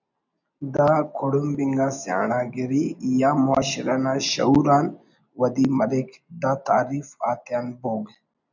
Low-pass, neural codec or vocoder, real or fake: 7.2 kHz; none; real